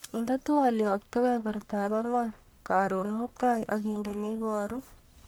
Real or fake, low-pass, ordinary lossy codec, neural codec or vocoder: fake; none; none; codec, 44.1 kHz, 1.7 kbps, Pupu-Codec